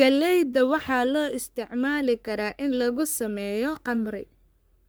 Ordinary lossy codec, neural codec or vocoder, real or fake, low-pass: none; codec, 44.1 kHz, 3.4 kbps, Pupu-Codec; fake; none